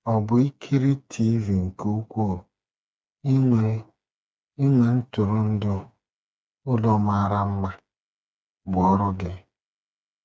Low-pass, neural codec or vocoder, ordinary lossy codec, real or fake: none; codec, 16 kHz, 4 kbps, FreqCodec, smaller model; none; fake